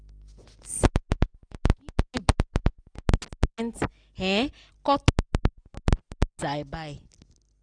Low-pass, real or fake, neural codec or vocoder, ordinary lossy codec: 9.9 kHz; real; none; none